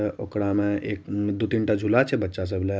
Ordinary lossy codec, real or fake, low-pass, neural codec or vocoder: none; real; none; none